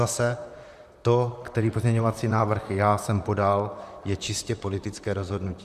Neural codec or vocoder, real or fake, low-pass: vocoder, 44.1 kHz, 128 mel bands, Pupu-Vocoder; fake; 14.4 kHz